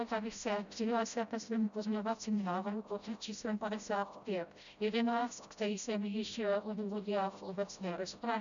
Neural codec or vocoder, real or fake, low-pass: codec, 16 kHz, 0.5 kbps, FreqCodec, smaller model; fake; 7.2 kHz